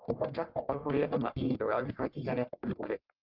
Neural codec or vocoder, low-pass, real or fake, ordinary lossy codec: codec, 44.1 kHz, 1.7 kbps, Pupu-Codec; 5.4 kHz; fake; Opus, 32 kbps